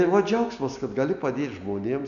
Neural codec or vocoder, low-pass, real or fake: none; 7.2 kHz; real